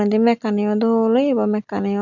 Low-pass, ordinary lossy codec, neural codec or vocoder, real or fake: 7.2 kHz; none; none; real